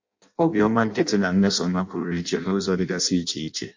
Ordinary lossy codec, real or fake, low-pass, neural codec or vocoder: MP3, 48 kbps; fake; 7.2 kHz; codec, 16 kHz in and 24 kHz out, 0.6 kbps, FireRedTTS-2 codec